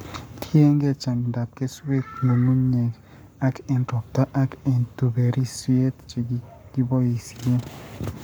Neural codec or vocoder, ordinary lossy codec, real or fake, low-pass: codec, 44.1 kHz, 7.8 kbps, Pupu-Codec; none; fake; none